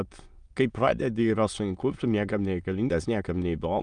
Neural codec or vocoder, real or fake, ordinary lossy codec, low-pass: autoencoder, 22.05 kHz, a latent of 192 numbers a frame, VITS, trained on many speakers; fake; Opus, 32 kbps; 9.9 kHz